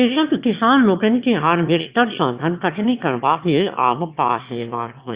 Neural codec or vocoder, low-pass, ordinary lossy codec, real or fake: autoencoder, 22.05 kHz, a latent of 192 numbers a frame, VITS, trained on one speaker; 3.6 kHz; Opus, 64 kbps; fake